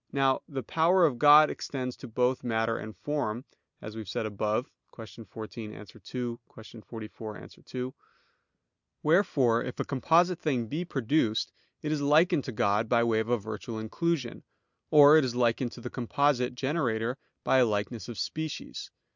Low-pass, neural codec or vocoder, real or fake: 7.2 kHz; none; real